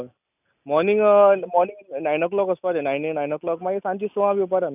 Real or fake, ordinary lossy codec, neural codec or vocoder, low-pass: real; none; none; 3.6 kHz